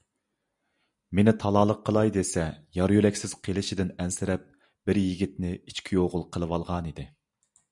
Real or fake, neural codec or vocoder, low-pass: real; none; 10.8 kHz